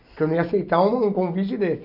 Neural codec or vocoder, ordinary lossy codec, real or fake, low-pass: none; AAC, 48 kbps; real; 5.4 kHz